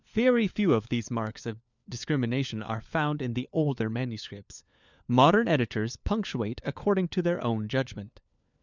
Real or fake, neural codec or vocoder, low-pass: fake; codec, 16 kHz, 4 kbps, FunCodec, trained on LibriTTS, 50 frames a second; 7.2 kHz